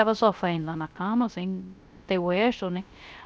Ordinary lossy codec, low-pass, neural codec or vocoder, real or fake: none; none; codec, 16 kHz, 0.3 kbps, FocalCodec; fake